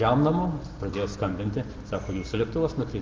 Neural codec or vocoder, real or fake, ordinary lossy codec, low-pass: none; real; Opus, 16 kbps; 7.2 kHz